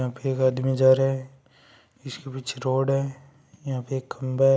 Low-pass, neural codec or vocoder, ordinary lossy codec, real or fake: none; none; none; real